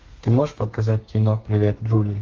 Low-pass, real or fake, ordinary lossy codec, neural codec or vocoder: 7.2 kHz; fake; Opus, 32 kbps; codec, 32 kHz, 1.9 kbps, SNAC